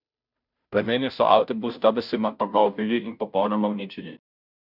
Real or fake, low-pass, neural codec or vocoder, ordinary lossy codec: fake; 5.4 kHz; codec, 16 kHz, 0.5 kbps, FunCodec, trained on Chinese and English, 25 frames a second; none